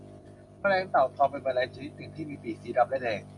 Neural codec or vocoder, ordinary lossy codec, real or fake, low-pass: none; AAC, 64 kbps; real; 10.8 kHz